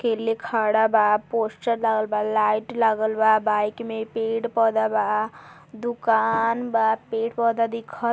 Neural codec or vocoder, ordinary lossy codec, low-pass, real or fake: none; none; none; real